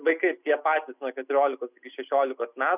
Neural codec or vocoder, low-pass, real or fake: none; 3.6 kHz; real